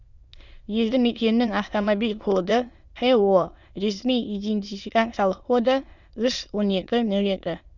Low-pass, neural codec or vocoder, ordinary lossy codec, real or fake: 7.2 kHz; autoencoder, 22.05 kHz, a latent of 192 numbers a frame, VITS, trained on many speakers; Opus, 64 kbps; fake